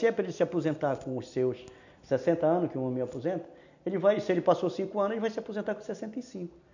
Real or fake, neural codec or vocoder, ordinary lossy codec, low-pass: real; none; none; 7.2 kHz